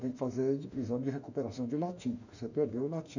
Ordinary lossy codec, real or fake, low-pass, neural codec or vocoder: none; fake; 7.2 kHz; autoencoder, 48 kHz, 32 numbers a frame, DAC-VAE, trained on Japanese speech